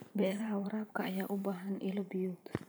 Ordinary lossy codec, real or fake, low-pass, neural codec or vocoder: none; fake; none; vocoder, 44.1 kHz, 128 mel bands every 512 samples, BigVGAN v2